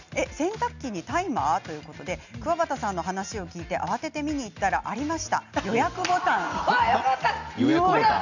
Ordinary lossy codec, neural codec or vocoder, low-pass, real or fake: none; none; 7.2 kHz; real